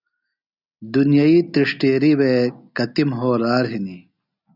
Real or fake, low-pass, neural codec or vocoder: real; 5.4 kHz; none